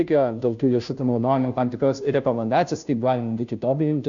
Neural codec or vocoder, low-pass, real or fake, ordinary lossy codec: codec, 16 kHz, 0.5 kbps, FunCodec, trained on Chinese and English, 25 frames a second; 7.2 kHz; fake; MP3, 64 kbps